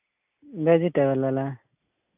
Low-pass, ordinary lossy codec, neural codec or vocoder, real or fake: 3.6 kHz; AAC, 32 kbps; none; real